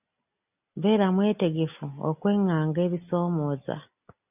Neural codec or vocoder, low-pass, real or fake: none; 3.6 kHz; real